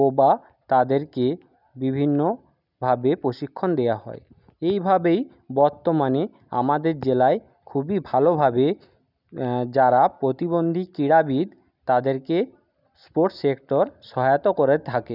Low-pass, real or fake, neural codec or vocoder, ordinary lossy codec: 5.4 kHz; real; none; none